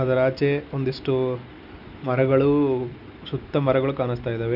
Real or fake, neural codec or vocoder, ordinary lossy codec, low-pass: real; none; none; 5.4 kHz